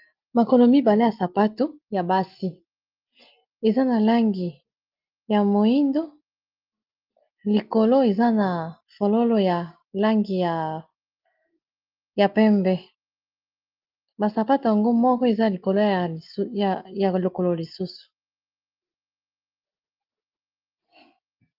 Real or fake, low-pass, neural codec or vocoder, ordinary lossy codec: real; 5.4 kHz; none; Opus, 32 kbps